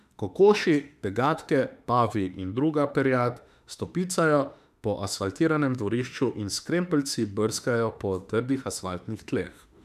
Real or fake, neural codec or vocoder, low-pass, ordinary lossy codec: fake; autoencoder, 48 kHz, 32 numbers a frame, DAC-VAE, trained on Japanese speech; 14.4 kHz; none